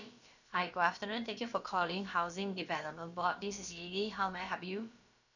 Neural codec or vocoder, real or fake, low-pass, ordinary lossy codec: codec, 16 kHz, about 1 kbps, DyCAST, with the encoder's durations; fake; 7.2 kHz; none